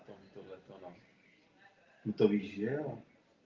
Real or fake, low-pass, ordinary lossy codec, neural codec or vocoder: real; 7.2 kHz; Opus, 32 kbps; none